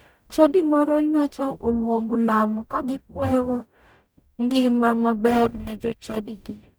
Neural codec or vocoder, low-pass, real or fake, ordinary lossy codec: codec, 44.1 kHz, 0.9 kbps, DAC; none; fake; none